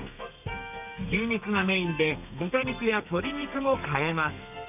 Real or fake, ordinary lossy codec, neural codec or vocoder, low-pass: fake; none; codec, 32 kHz, 1.9 kbps, SNAC; 3.6 kHz